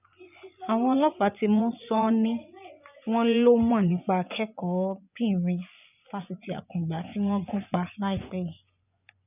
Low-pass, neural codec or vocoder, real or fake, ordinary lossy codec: 3.6 kHz; vocoder, 24 kHz, 100 mel bands, Vocos; fake; none